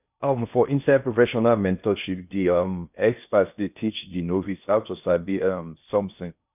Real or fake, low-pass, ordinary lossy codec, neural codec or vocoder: fake; 3.6 kHz; none; codec, 16 kHz in and 24 kHz out, 0.6 kbps, FocalCodec, streaming, 4096 codes